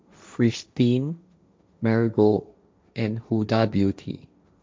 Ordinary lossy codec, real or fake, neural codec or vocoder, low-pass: none; fake; codec, 16 kHz, 1.1 kbps, Voila-Tokenizer; none